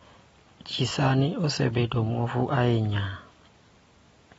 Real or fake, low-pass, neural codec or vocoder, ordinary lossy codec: real; 19.8 kHz; none; AAC, 24 kbps